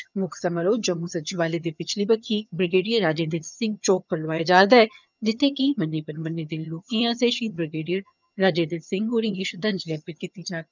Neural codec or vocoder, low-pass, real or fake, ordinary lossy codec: vocoder, 22.05 kHz, 80 mel bands, HiFi-GAN; 7.2 kHz; fake; none